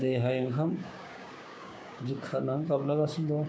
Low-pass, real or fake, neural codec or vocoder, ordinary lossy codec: none; fake; codec, 16 kHz, 6 kbps, DAC; none